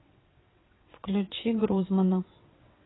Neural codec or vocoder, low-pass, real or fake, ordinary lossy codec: vocoder, 22.05 kHz, 80 mel bands, WaveNeXt; 7.2 kHz; fake; AAC, 16 kbps